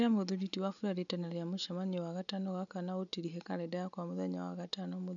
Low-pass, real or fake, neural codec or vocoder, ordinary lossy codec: 7.2 kHz; real; none; none